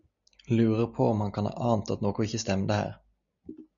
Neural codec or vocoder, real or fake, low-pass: none; real; 7.2 kHz